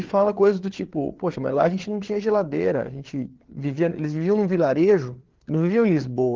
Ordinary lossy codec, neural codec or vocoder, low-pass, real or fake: Opus, 16 kbps; codec, 16 kHz, 4 kbps, FreqCodec, larger model; 7.2 kHz; fake